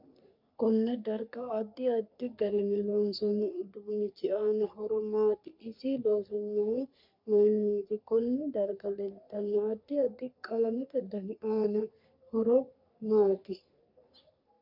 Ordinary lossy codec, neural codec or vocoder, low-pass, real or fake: MP3, 48 kbps; codec, 44.1 kHz, 3.4 kbps, Pupu-Codec; 5.4 kHz; fake